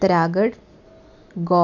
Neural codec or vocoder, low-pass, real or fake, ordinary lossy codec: none; 7.2 kHz; real; none